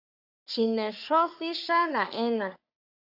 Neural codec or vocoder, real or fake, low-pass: codec, 44.1 kHz, 3.4 kbps, Pupu-Codec; fake; 5.4 kHz